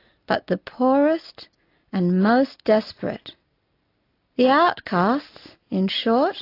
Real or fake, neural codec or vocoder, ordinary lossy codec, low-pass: real; none; AAC, 32 kbps; 5.4 kHz